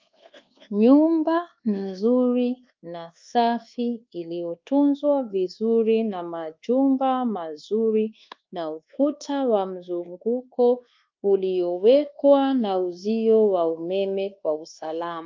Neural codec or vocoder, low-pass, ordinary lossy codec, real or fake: codec, 24 kHz, 1.2 kbps, DualCodec; 7.2 kHz; Opus, 24 kbps; fake